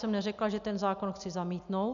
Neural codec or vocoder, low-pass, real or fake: none; 7.2 kHz; real